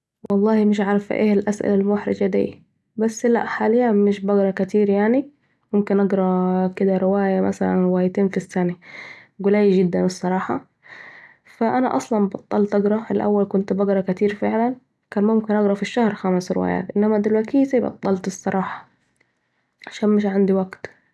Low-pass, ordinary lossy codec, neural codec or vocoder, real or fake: none; none; none; real